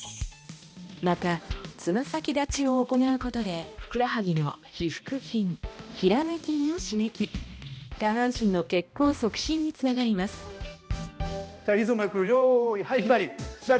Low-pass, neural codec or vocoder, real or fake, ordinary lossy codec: none; codec, 16 kHz, 1 kbps, X-Codec, HuBERT features, trained on balanced general audio; fake; none